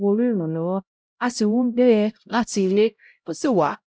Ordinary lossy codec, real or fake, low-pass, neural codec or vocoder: none; fake; none; codec, 16 kHz, 0.5 kbps, X-Codec, HuBERT features, trained on LibriSpeech